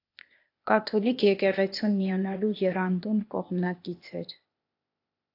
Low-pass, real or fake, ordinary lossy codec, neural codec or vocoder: 5.4 kHz; fake; AAC, 32 kbps; codec, 16 kHz, 0.8 kbps, ZipCodec